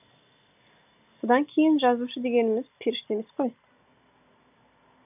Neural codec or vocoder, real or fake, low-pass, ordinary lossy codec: none; real; 3.6 kHz; none